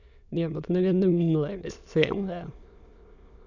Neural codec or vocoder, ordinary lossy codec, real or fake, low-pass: autoencoder, 22.05 kHz, a latent of 192 numbers a frame, VITS, trained on many speakers; none; fake; 7.2 kHz